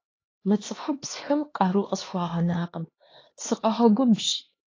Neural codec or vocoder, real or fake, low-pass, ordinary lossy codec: codec, 16 kHz, 2 kbps, X-Codec, HuBERT features, trained on LibriSpeech; fake; 7.2 kHz; AAC, 32 kbps